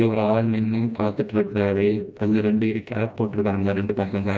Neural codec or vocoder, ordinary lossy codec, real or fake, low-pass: codec, 16 kHz, 1 kbps, FreqCodec, smaller model; none; fake; none